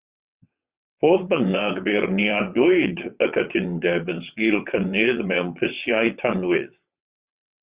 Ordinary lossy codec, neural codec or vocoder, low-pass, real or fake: Opus, 64 kbps; vocoder, 22.05 kHz, 80 mel bands, WaveNeXt; 3.6 kHz; fake